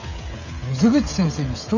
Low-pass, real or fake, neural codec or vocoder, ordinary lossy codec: 7.2 kHz; fake; codec, 16 kHz, 16 kbps, FreqCodec, smaller model; none